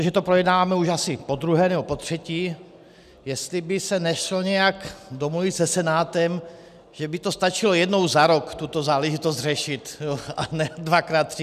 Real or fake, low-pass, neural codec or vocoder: real; 14.4 kHz; none